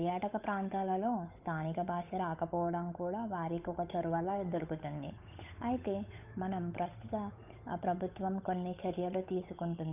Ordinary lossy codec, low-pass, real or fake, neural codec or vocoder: none; 3.6 kHz; fake; codec, 16 kHz, 8 kbps, FunCodec, trained on Chinese and English, 25 frames a second